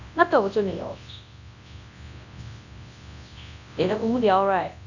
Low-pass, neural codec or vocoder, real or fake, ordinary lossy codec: 7.2 kHz; codec, 24 kHz, 0.9 kbps, WavTokenizer, large speech release; fake; none